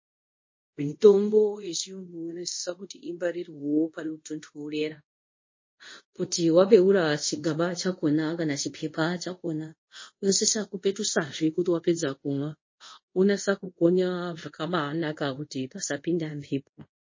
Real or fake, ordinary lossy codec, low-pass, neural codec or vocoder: fake; MP3, 32 kbps; 7.2 kHz; codec, 24 kHz, 0.5 kbps, DualCodec